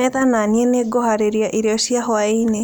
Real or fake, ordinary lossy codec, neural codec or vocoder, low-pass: real; none; none; none